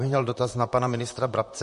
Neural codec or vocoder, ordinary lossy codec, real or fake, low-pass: vocoder, 44.1 kHz, 128 mel bands, Pupu-Vocoder; MP3, 48 kbps; fake; 14.4 kHz